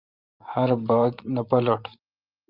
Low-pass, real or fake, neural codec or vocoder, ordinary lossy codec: 5.4 kHz; fake; vocoder, 44.1 kHz, 128 mel bands every 512 samples, BigVGAN v2; Opus, 32 kbps